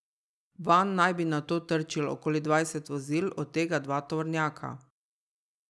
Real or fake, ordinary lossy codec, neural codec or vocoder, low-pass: real; none; none; none